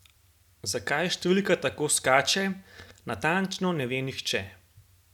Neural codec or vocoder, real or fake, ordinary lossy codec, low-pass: none; real; none; 19.8 kHz